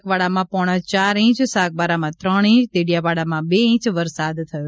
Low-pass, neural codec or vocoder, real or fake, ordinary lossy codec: none; none; real; none